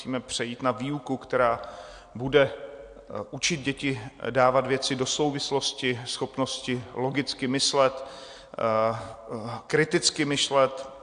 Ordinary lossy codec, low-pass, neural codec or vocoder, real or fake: AAC, 64 kbps; 9.9 kHz; none; real